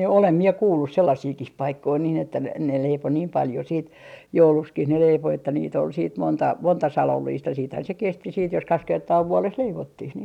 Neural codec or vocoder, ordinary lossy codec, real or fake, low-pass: none; none; real; 19.8 kHz